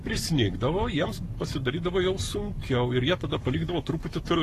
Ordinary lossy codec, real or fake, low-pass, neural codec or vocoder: AAC, 48 kbps; fake; 14.4 kHz; codec, 44.1 kHz, 7.8 kbps, Pupu-Codec